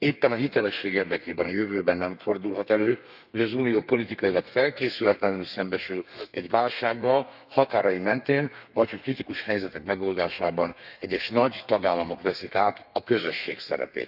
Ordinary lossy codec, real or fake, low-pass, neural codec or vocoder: none; fake; 5.4 kHz; codec, 32 kHz, 1.9 kbps, SNAC